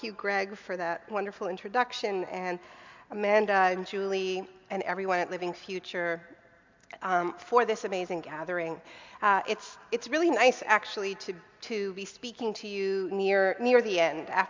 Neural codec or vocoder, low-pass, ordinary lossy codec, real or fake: none; 7.2 kHz; MP3, 64 kbps; real